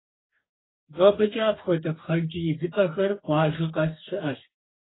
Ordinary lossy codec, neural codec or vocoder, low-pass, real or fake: AAC, 16 kbps; codec, 44.1 kHz, 2.6 kbps, DAC; 7.2 kHz; fake